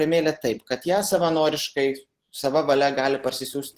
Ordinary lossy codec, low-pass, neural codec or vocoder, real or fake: Opus, 16 kbps; 14.4 kHz; none; real